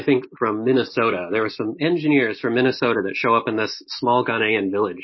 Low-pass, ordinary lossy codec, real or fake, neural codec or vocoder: 7.2 kHz; MP3, 24 kbps; real; none